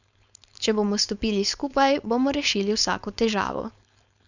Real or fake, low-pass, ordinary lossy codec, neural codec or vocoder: fake; 7.2 kHz; none; codec, 16 kHz, 4.8 kbps, FACodec